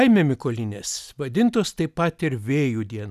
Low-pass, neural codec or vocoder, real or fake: 14.4 kHz; none; real